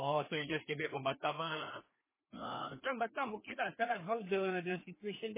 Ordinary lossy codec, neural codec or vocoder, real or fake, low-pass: MP3, 16 kbps; codec, 16 kHz, 2 kbps, FreqCodec, larger model; fake; 3.6 kHz